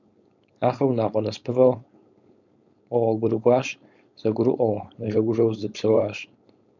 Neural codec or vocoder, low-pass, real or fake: codec, 16 kHz, 4.8 kbps, FACodec; 7.2 kHz; fake